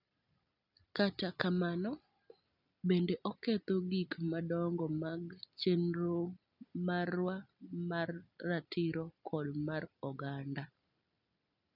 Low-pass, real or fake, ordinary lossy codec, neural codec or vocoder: 5.4 kHz; real; none; none